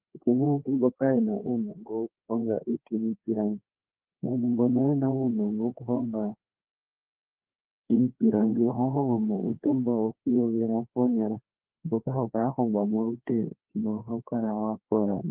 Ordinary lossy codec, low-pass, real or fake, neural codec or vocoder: Opus, 16 kbps; 3.6 kHz; fake; codec, 16 kHz, 2 kbps, FreqCodec, larger model